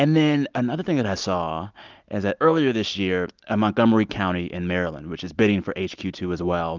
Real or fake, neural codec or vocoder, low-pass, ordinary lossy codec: real; none; 7.2 kHz; Opus, 24 kbps